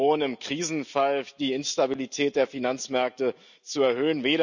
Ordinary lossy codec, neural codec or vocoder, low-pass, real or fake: none; none; 7.2 kHz; real